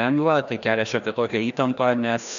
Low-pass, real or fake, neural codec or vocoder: 7.2 kHz; fake; codec, 16 kHz, 1 kbps, FreqCodec, larger model